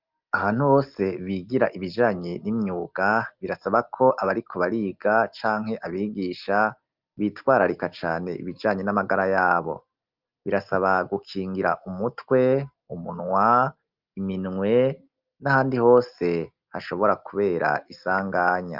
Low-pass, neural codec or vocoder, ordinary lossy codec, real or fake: 5.4 kHz; none; Opus, 24 kbps; real